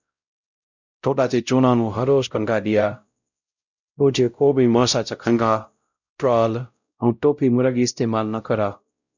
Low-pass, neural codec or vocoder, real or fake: 7.2 kHz; codec, 16 kHz, 0.5 kbps, X-Codec, WavLM features, trained on Multilingual LibriSpeech; fake